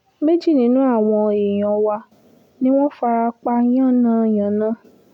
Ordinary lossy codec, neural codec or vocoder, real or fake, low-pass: none; none; real; 19.8 kHz